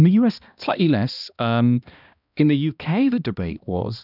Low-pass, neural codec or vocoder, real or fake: 5.4 kHz; codec, 16 kHz, 1 kbps, X-Codec, HuBERT features, trained on balanced general audio; fake